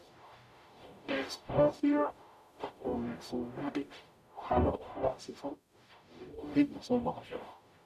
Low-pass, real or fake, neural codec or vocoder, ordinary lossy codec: 14.4 kHz; fake; codec, 44.1 kHz, 0.9 kbps, DAC; none